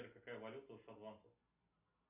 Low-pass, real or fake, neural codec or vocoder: 3.6 kHz; real; none